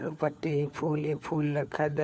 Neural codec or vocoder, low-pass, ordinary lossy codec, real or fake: codec, 16 kHz, 4 kbps, FunCodec, trained on LibriTTS, 50 frames a second; none; none; fake